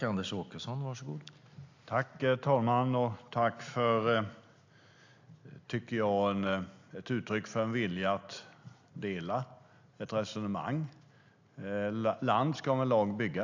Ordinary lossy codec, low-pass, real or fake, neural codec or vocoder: AAC, 48 kbps; 7.2 kHz; real; none